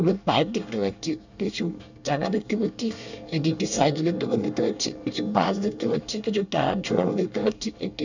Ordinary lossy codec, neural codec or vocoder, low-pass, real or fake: none; codec, 24 kHz, 1 kbps, SNAC; 7.2 kHz; fake